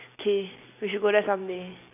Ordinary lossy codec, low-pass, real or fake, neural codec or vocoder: none; 3.6 kHz; real; none